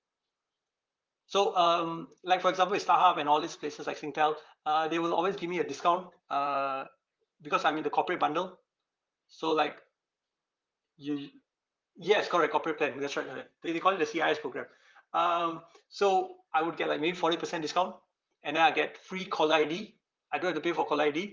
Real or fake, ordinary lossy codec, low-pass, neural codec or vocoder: fake; Opus, 32 kbps; 7.2 kHz; vocoder, 44.1 kHz, 128 mel bands, Pupu-Vocoder